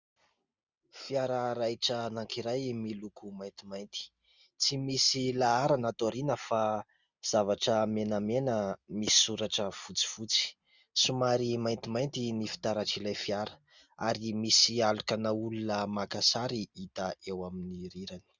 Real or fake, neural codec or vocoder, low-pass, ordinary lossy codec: real; none; 7.2 kHz; Opus, 64 kbps